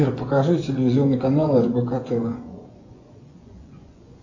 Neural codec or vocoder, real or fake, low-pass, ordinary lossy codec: vocoder, 24 kHz, 100 mel bands, Vocos; fake; 7.2 kHz; AAC, 48 kbps